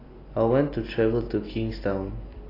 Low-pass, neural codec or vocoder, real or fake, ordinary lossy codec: 5.4 kHz; none; real; AAC, 24 kbps